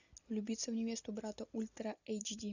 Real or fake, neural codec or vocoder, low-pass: real; none; 7.2 kHz